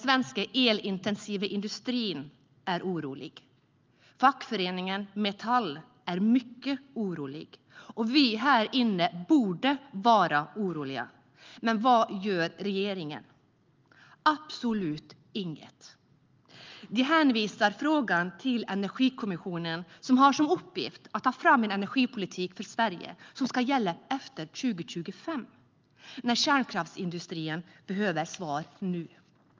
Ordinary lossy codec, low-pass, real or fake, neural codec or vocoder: Opus, 24 kbps; 7.2 kHz; real; none